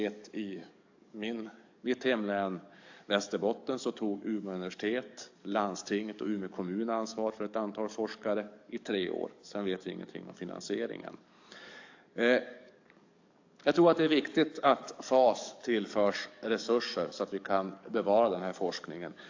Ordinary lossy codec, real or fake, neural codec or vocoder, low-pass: AAC, 48 kbps; fake; codec, 44.1 kHz, 7.8 kbps, DAC; 7.2 kHz